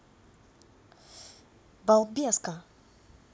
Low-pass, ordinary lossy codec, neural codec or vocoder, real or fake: none; none; none; real